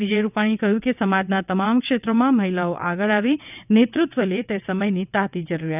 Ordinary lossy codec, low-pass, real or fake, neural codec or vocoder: none; 3.6 kHz; fake; vocoder, 22.05 kHz, 80 mel bands, WaveNeXt